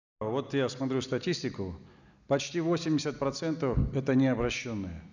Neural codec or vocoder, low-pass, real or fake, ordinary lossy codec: none; 7.2 kHz; real; none